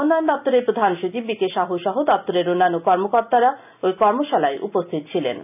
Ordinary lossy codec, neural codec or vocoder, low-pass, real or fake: none; none; 3.6 kHz; real